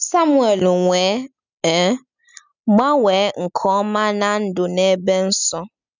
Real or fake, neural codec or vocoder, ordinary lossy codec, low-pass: real; none; none; 7.2 kHz